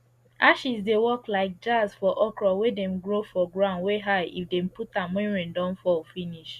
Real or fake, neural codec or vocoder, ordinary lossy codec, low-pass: real; none; Opus, 64 kbps; 14.4 kHz